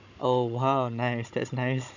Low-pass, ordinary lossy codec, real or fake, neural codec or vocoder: 7.2 kHz; none; fake; codec, 16 kHz, 16 kbps, FunCodec, trained on Chinese and English, 50 frames a second